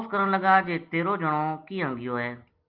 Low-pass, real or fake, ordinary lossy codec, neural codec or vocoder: 5.4 kHz; real; Opus, 32 kbps; none